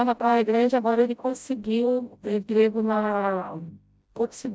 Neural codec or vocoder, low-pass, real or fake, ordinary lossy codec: codec, 16 kHz, 0.5 kbps, FreqCodec, smaller model; none; fake; none